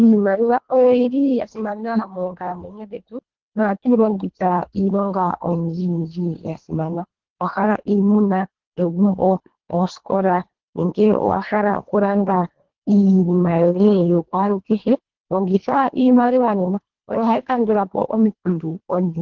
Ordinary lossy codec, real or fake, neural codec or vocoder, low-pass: Opus, 16 kbps; fake; codec, 24 kHz, 1.5 kbps, HILCodec; 7.2 kHz